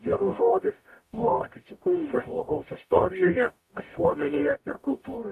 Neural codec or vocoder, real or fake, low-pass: codec, 44.1 kHz, 0.9 kbps, DAC; fake; 14.4 kHz